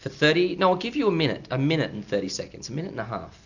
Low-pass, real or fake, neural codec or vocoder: 7.2 kHz; real; none